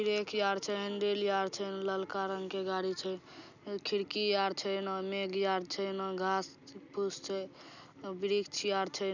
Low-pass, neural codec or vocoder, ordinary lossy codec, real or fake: 7.2 kHz; none; none; real